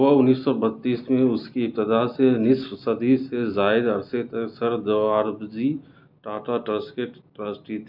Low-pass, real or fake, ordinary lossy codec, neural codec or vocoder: 5.4 kHz; real; none; none